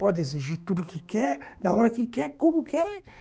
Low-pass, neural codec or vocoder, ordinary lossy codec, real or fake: none; codec, 16 kHz, 2 kbps, X-Codec, HuBERT features, trained on balanced general audio; none; fake